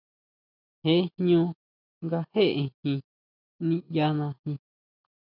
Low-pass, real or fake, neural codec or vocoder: 5.4 kHz; real; none